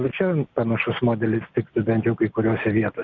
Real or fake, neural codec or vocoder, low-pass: real; none; 7.2 kHz